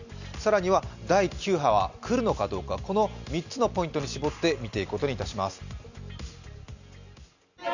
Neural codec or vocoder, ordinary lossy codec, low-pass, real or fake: none; none; 7.2 kHz; real